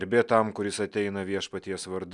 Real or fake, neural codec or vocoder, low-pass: real; none; 10.8 kHz